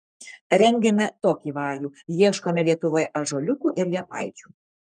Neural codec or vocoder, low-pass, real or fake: codec, 44.1 kHz, 3.4 kbps, Pupu-Codec; 9.9 kHz; fake